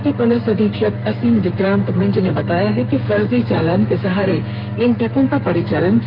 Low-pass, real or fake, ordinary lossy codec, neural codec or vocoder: 5.4 kHz; fake; Opus, 24 kbps; codec, 32 kHz, 1.9 kbps, SNAC